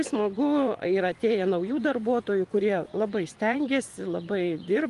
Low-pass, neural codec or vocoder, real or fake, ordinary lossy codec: 10.8 kHz; vocoder, 24 kHz, 100 mel bands, Vocos; fake; Opus, 32 kbps